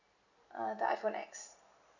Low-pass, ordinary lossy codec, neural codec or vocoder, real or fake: 7.2 kHz; none; none; real